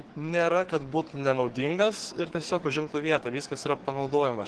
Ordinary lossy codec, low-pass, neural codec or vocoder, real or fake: Opus, 16 kbps; 10.8 kHz; codec, 44.1 kHz, 2.6 kbps, SNAC; fake